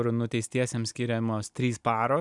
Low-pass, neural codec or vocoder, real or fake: 10.8 kHz; none; real